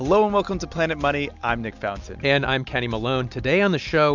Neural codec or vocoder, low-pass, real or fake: none; 7.2 kHz; real